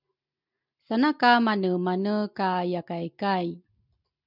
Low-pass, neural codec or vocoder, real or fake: 5.4 kHz; none; real